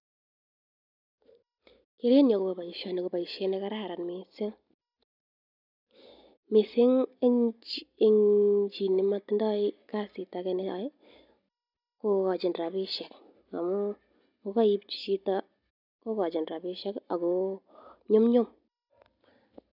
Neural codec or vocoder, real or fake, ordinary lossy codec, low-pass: none; real; none; 5.4 kHz